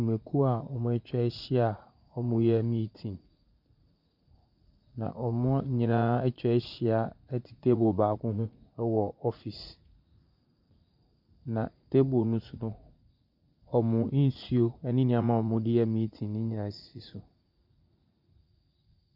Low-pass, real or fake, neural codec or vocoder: 5.4 kHz; fake; vocoder, 44.1 kHz, 80 mel bands, Vocos